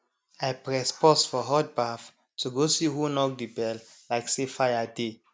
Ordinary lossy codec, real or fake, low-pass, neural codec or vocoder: none; real; none; none